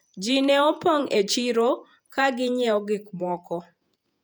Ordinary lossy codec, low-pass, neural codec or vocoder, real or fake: none; 19.8 kHz; vocoder, 48 kHz, 128 mel bands, Vocos; fake